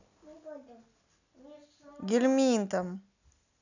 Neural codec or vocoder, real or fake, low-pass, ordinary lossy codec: none; real; 7.2 kHz; none